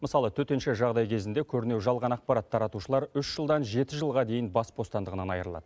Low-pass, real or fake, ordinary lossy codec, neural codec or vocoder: none; real; none; none